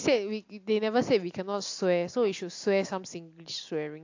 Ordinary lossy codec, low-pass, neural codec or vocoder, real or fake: AAC, 48 kbps; 7.2 kHz; none; real